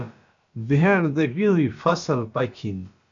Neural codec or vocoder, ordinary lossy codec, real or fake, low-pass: codec, 16 kHz, about 1 kbps, DyCAST, with the encoder's durations; AAC, 64 kbps; fake; 7.2 kHz